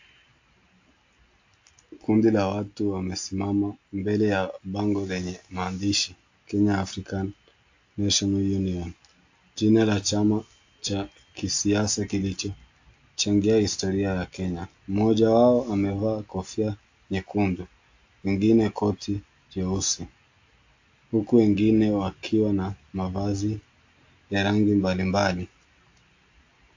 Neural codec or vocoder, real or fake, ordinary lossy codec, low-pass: none; real; AAC, 48 kbps; 7.2 kHz